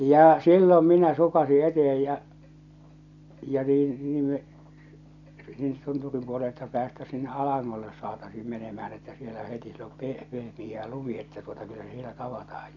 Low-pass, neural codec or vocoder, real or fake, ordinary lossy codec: 7.2 kHz; none; real; none